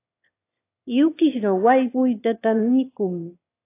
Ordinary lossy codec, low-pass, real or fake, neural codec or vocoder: AAC, 24 kbps; 3.6 kHz; fake; autoencoder, 22.05 kHz, a latent of 192 numbers a frame, VITS, trained on one speaker